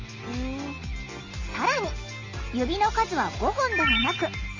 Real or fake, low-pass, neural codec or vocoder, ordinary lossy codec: real; 7.2 kHz; none; Opus, 32 kbps